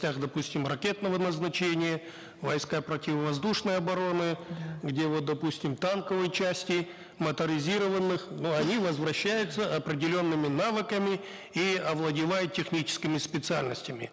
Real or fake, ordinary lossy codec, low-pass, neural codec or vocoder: real; none; none; none